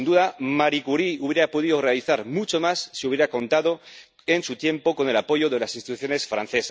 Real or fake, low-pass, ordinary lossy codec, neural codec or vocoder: real; none; none; none